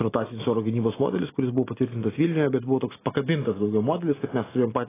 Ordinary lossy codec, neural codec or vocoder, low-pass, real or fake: AAC, 16 kbps; none; 3.6 kHz; real